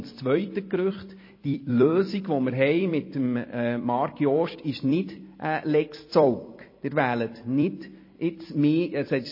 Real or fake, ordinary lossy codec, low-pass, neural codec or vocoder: fake; MP3, 24 kbps; 5.4 kHz; vocoder, 44.1 kHz, 128 mel bands every 256 samples, BigVGAN v2